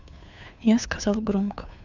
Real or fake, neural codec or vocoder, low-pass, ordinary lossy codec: fake; codec, 16 kHz, 2 kbps, FunCodec, trained on Chinese and English, 25 frames a second; 7.2 kHz; none